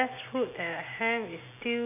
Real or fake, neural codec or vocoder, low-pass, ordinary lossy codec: real; none; 3.6 kHz; none